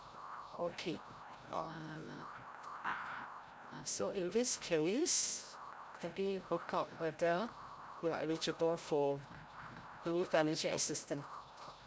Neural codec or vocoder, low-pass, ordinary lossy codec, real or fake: codec, 16 kHz, 0.5 kbps, FreqCodec, larger model; none; none; fake